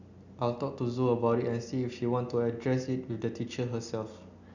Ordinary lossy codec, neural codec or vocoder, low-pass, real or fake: none; none; 7.2 kHz; real